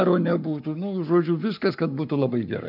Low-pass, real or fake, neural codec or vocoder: 5.4 kHz; fake; codec, 44.1 kHz, 7.8 kbps, Pupu-Codec